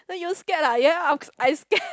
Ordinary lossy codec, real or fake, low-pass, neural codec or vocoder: none; real; none; none